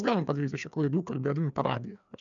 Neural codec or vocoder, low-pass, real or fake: codec, 16 kHz, 2 kbps, FreqCodec, larger model; 7.2 kHz; fake